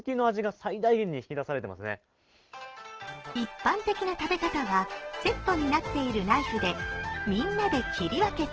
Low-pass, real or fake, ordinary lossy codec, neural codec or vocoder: 7.2 kHz; real; Opus, 16 kbps; none